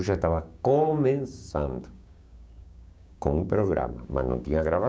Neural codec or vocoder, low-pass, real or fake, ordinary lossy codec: codec, 16 kHz, 6 kbps, DAC; none; fake; none